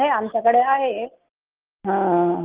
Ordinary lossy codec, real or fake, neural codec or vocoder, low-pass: Opus, 32 kbps; real; none; 3.6 kHz